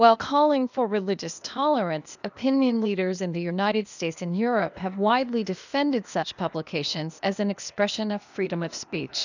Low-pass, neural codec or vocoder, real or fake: 7.2 kHz; codec, 16 kHz, 0.8 kbps, ZipCodec; fake